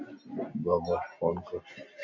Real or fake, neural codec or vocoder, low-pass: real; none; 7.2 kHz